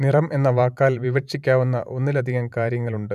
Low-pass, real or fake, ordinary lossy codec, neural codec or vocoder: 14.4 kHz; fake; none; vocoder, 44.1 kHz, 128 mel bands every 512 samples, BigVGAN v2